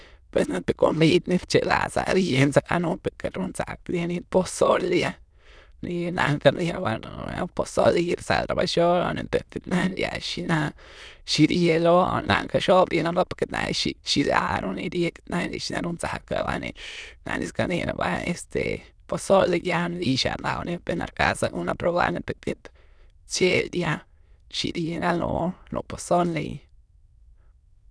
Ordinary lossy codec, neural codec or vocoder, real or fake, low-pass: none; autoencoder, 22.05 kHz, a latent of 192 numbers a frame, VITS, trained on many speakers; fake; none